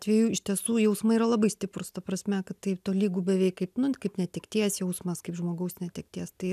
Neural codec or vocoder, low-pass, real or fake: none; 14.4 kHz; real